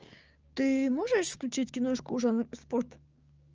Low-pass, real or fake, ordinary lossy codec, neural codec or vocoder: 7.2 kHz; fake; Opus, 24 kbps; codec, 16 kHz, 6 kbps, DAC